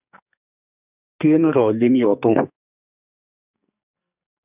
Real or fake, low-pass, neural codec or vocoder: fake; 3.6 kHz; codec, 44.1 kHz, 2.6 kbps, SNAC